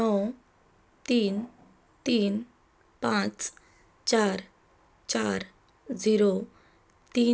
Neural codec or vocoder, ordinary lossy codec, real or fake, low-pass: none; none; real; none